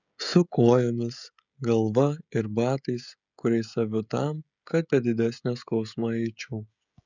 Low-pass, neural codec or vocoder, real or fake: 7.2 kHz; codec, 16 kHz, 16 kbps, FreqCodec, smaller model; fake